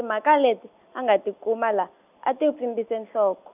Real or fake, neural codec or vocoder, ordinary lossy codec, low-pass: real; none; none; 3.6 kHz